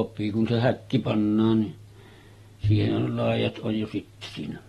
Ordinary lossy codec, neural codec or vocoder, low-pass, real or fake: AAC, 32 kbps; none; 14.4 kHz; real